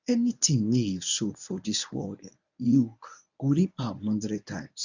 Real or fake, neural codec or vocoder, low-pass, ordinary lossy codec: fake; codec, 24 kHz, 0.9 kbps, WavTokenizer, medium speech release version 1; 7.2 kHz; none